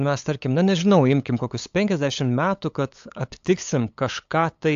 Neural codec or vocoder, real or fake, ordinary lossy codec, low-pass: codec, 16 kHz, 8 kbps, FunCodec, trained on LibriTTS, 25 frames a second; fake; AAC, 64 kbps; 7.2 kHz